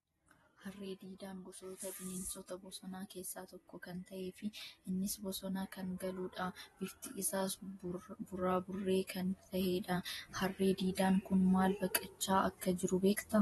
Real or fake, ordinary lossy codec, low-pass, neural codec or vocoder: fake; AAC, 32 kbps; 19.8 kHz; vocoder, 48 kHz, 128 mel bands, Vocos